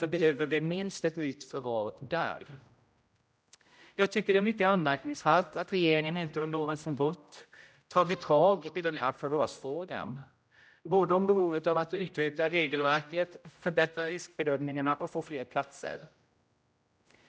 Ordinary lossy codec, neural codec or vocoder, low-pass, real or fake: none; codec, 16 kHz, 0.5 kbps, X-Codec, HuBERT features, trained on general audio; none; fake